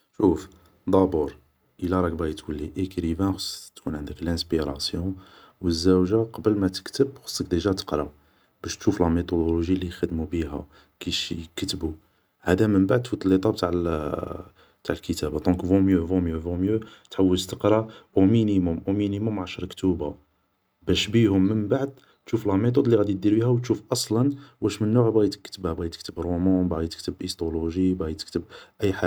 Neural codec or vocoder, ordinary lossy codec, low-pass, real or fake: none; none; none; real